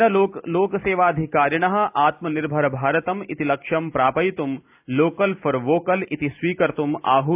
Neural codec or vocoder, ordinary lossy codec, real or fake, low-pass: none; AAC, 32 kbps; real; 3.6 kHz